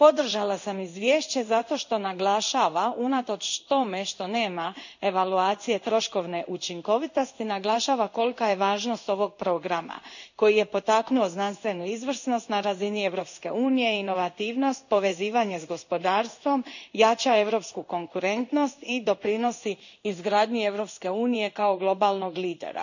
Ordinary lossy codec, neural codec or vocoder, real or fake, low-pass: none; codec, 16 kHz in and 24 kHz out, 1 kbps, XY-Tokenizer; fake; 7.2 kHz